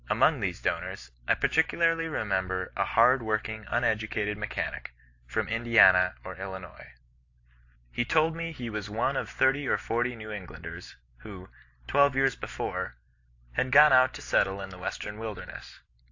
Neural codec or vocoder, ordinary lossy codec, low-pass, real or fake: none; AAC, 48 kbps; 7.2 kHz; real